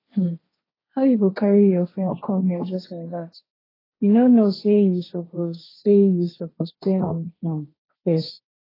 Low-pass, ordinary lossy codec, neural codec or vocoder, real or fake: 5.4 kHz; AAC, 24 kbps; codec, 16 kHz, 1.1 kbps, Voila-Tokenizer; fake